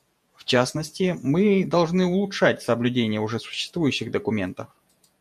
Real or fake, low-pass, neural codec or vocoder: fake; 14.4 kHz; vocoder, 48 kHz, 128 mel bands, Vocos